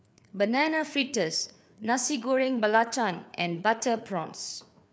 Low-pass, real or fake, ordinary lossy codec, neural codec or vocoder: none; fake; none; codec, 16 kHz, 4 kbps, FreqCodec, larger model